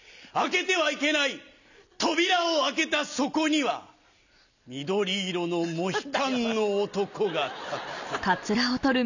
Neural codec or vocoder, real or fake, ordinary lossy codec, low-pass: none; real; none; 7.2 kHz